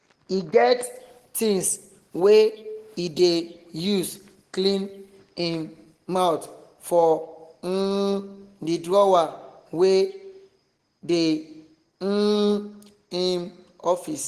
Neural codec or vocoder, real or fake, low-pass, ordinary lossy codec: none; real; 14.4 kHz; Opus, 16 kbps